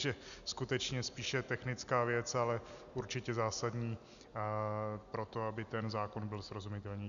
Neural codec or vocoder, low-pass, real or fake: none; 7.2 kHz; real